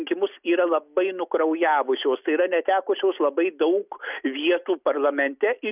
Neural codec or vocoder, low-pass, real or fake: none; 3.6 kHz; real